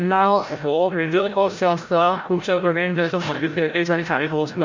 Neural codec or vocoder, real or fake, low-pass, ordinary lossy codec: codec, 16 kHz, 0.5 kbps, FreqCodec, larger model; fake; 7.2 kHz; MP3, 64 kbps